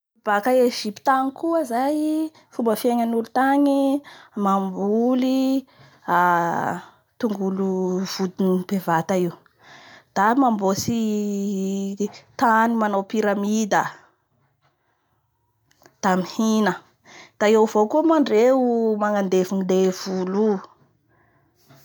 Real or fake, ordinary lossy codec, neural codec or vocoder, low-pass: real; none; none; none